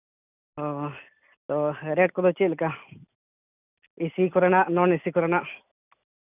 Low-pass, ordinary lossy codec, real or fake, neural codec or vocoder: 3.6 kHz; none; real; none